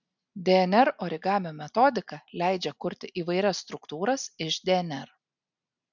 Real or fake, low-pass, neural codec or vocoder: real; 7.2 kHz; none